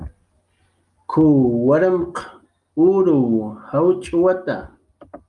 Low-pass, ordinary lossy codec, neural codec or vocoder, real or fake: 10.8 kHz; Opus, 32 kbps; none; real